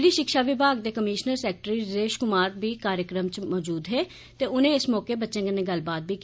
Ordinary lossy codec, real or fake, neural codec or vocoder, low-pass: none; real; none; none